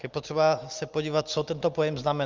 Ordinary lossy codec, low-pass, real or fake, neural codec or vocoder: Opus, 24 kbps; 7.2 kHz; real; none